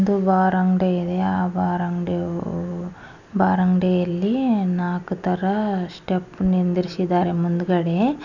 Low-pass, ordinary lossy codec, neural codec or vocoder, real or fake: 7.2 kHz; none; none; real